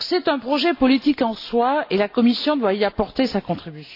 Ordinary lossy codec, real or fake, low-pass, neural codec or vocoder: AAC, 32 kbps; real; 5.4 kHz; none